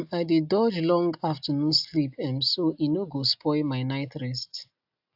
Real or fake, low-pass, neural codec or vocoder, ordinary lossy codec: real; 5.4 kHz; none; AAC, 48 kbps